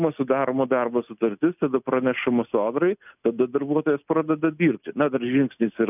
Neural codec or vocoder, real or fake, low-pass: none; real; 3.6 kHz